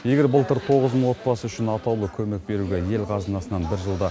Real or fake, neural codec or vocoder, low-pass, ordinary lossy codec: real; none; none; none